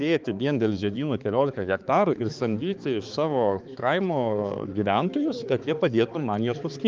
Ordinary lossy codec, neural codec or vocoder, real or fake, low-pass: Opus, 24 kbps; codec, 16 kHz, 4 kbps, X-Codec, HuBERT features, trained on balanced general audio; fake; 7.2 kHz